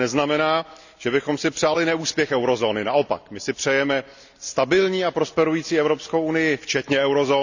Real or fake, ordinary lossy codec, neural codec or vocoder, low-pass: real; none; none; 7.2 kHz